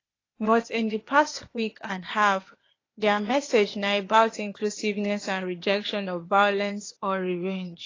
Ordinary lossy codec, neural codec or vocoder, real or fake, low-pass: AAC, 32 kbps; codec, 16 kHz, 0.8 kbps, ZipCodec; fake; 7.2 kHz